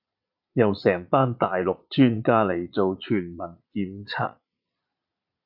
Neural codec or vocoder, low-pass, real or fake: vocoder, 22.05 kHz, 80 mel bands, Vocos; 5.4 kHz; fake